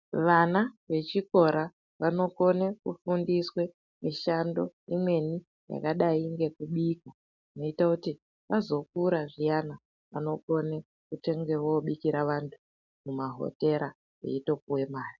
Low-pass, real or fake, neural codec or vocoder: 7.2 kHz; real; none